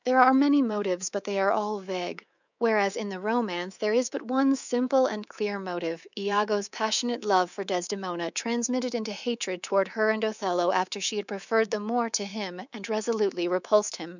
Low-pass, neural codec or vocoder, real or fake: 7.2 kHz; codec, 24 kHz, 3.1 kbps, DualCodec; fake